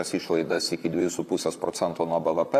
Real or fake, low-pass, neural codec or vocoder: fake; 14.4 kHz; vocoder, 44.1 kHz, 128 mel bands, Pupu-Vocoder